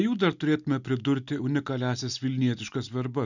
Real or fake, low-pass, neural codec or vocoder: real; 7.2 kHz; none